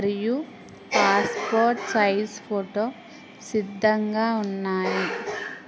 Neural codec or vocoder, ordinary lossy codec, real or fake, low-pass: none; none; real; none